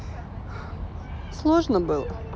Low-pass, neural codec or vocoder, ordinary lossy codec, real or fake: none; none; none; real